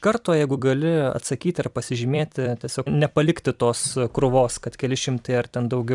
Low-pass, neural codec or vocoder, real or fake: 10.8 kHz; vocoder, 44.1 kHz, 128 mel bands every 256 samples, BigVGAN v2; fake